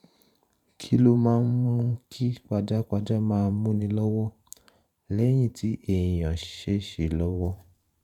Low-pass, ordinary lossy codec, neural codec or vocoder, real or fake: 19.8 kHz; none; vocoder, 44.1 kHz, 128 mel bands every 512 samples, BigVGAN v2; fake